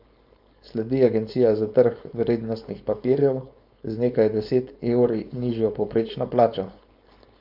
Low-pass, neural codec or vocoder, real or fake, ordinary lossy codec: 5.4 kHz; codec, 16 kHz, 4.8 kbps, FACodec; fake; MP3, 48 kbps